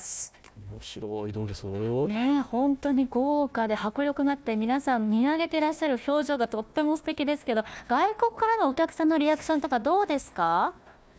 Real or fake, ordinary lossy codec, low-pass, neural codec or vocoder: fake; none; none; codec, 16 kHz, 1 kbps, FunCodec, trained on Chinese and English, 50 frames a second